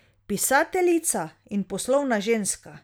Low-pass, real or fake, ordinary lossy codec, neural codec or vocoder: none; real; none; none